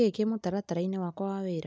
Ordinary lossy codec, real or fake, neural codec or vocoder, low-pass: none; real; none; none